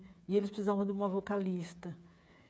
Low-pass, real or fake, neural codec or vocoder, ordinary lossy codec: none; fake; codec, 16 kHz, 8 kbps, FreqCodec, smaller model; none